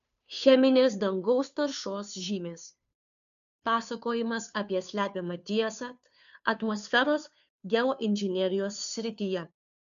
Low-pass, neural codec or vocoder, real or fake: 7.2 kHz; codec, 16 kHz, 2 kbps, FunCodec, trained on Chinese and English, 25 frames a second; fake